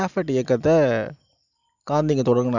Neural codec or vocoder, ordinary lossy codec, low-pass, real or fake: none; none; 7.2 kHz; real